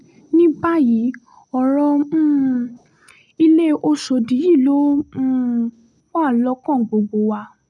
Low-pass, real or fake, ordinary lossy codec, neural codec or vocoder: none; real; none; none